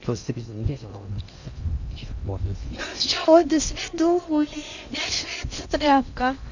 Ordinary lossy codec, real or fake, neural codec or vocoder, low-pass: none; fake; codec, 16 kHz in and 24 kHz out, 0.6 kbps, FocalCodec, streaming, 4096 codes; 7.2 kHz